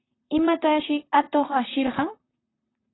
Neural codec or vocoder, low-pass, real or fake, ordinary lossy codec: vocoder, 44.1 kHz, 128 mel bands, Pupu-Vocoder; 7.2 kHz; fake; AAC, 16 kbps